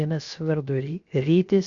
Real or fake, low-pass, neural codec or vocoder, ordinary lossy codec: fake; 7.2 kHz; codec, 16 kHz, 0.7 kbps, FocalCodec; Opus, 64 kbps